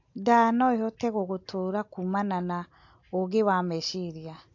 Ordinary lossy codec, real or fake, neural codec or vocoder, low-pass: AAC, 48 kbps; real; none; 7.2 kHz